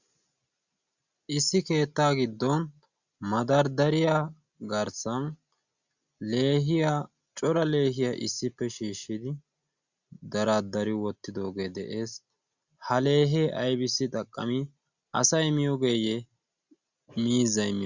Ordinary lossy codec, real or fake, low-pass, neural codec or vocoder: Opus, 64 kbps; real; 7.2 kHz; none